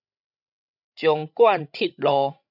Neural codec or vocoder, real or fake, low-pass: codec, 16 kHz, 16 kbps, FreqCodec, larger model; fake; 5.4 kHz